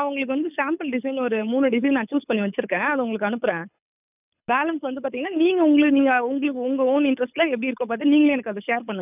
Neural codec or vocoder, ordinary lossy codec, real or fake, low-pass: codec, 24 kHz, 6 kbps, HILCodec; none; fake; 3.6 kHz